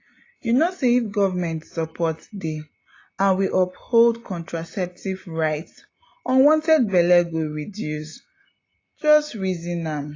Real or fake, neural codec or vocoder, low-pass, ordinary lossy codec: real; none; 7.2 kHz; AAC, 32 kbps